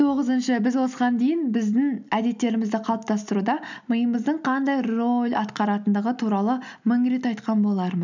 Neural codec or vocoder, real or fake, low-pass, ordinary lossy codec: none; real; 7.2 kHz; none